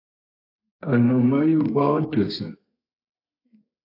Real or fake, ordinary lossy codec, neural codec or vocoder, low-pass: fake; AAC, 32 kbps; codec, 32 kHz, 1.9 kbps, SNAC; 5.4 kHz